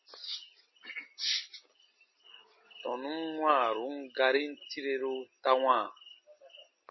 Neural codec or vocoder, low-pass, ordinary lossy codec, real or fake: none; 7.2 kHz; MP3, 24 kbps; real